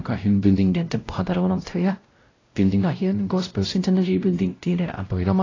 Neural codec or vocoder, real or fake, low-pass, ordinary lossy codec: codec, 16 kHz, 0.5 kbps, X-Codec, WavLM features, trained on Multilingual LibriSpeech; fake; 7.2 kHz; AAC, 32 kbps